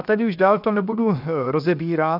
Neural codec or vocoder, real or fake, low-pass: codec, 16 kHz, 0.7 kbps, FocalCodec; fake; 5.4 kHz